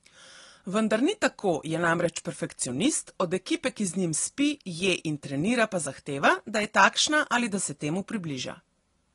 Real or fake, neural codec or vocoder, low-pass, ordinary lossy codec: real; none; 10.8 kHz; AAC, 32 kbps